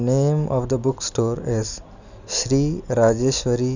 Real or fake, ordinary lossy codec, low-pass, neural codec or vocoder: real; none; 7.2 kHz; none